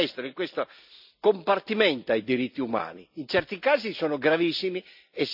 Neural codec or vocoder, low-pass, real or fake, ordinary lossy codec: none; 5.4 kHz; real; none